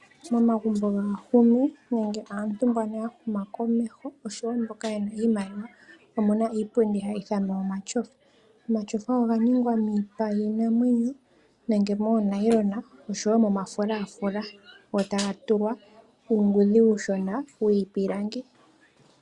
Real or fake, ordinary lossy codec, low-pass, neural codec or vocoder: real; Opus, 64 kbps; 10.8 kHz; none